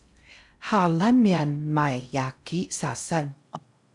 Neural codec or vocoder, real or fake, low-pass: codec, 16 kHz in and 24 kHz out, 0.6 kbps, FocalCodec, streaming, 4096 codes; fake; 10.8 kHz